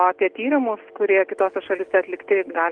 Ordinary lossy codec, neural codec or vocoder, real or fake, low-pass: Opus, 24 kbps; none; real; 9.9 kHz